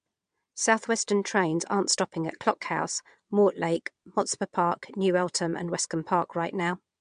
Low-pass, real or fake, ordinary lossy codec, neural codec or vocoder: 9.9 kHz; fake; MP3, 64 kbps; vocoder, 22.05 kHz, 80 mel bands, WaveNeXt